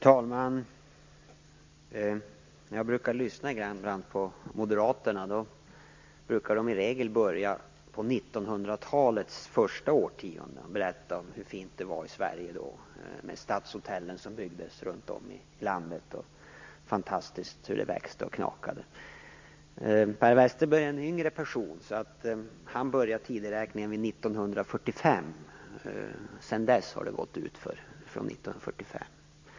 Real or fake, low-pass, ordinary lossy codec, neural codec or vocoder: real; 7.2 kHz; MP3, 48 kbps; none